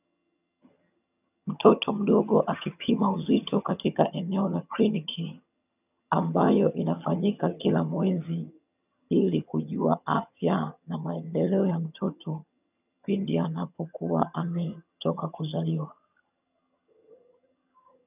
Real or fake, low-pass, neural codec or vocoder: fake; 3.6 kHz; vocoder, 22.05 kHz, 80 mel bands, HiFi-GAN